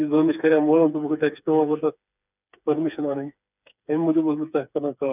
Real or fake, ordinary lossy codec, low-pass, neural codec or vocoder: fake; none; 3.6 kHz; codec, 16 kHz, 4 kbps, FreqCodec, smaller model